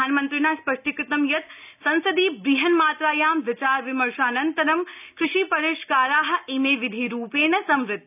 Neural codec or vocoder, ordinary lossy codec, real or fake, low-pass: none; none; real; 3.6 kHz